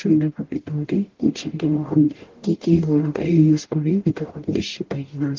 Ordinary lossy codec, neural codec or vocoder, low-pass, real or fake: Opus, 16 kbps; codec, 44.1 kHz, 0.9 kbps, DAC; 7.2 kHz; fake